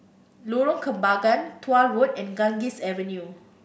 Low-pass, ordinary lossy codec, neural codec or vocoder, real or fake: none; none; none; real